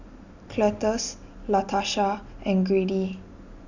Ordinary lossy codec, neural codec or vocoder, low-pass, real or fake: none; none; 7.2 kHz; real